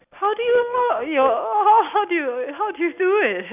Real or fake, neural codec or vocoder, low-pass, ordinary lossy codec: real; none; 3.6 kHz; none